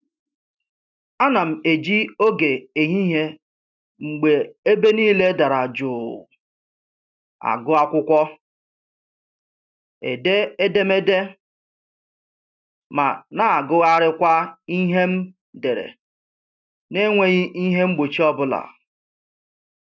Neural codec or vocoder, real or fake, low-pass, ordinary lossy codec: none; real; 7.2 kHz; none